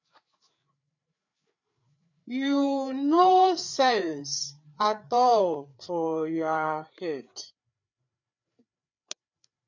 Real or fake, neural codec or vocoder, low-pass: fake; codec, 16 kHz, 4 kbps, FreqCodec, larger model; 7.2 kHz